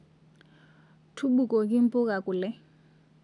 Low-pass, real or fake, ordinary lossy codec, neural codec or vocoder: 10.8 kHz; real; none; none